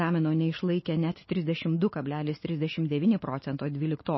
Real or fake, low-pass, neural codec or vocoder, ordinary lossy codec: real; 7.2 kHz; none; MP3, 24 kbps